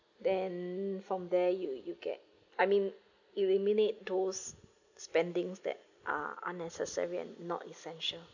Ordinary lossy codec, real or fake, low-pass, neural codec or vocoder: none; real; 7.2 kHz; none